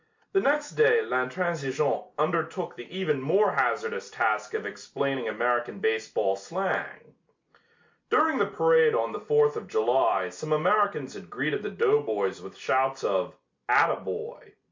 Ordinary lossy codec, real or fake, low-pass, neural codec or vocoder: MP3, 48 kbps; real; 7.2 kHz; none